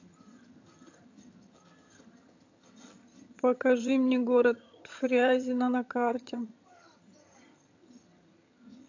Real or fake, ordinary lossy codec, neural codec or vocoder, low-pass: fake; AAC, 48 kbps; vocoder, 22.05 kHz, 80 mel bands, HiFi-GAN; 7.2 kHz